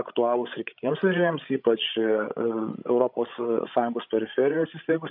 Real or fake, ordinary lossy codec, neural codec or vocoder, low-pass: fake; AAC, 48 kbps; codec, 16 kHz, 16 kbps, FreqCodec, larger model; 5.4 kHz